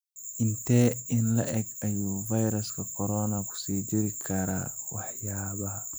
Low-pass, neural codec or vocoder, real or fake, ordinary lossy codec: none; none; real; none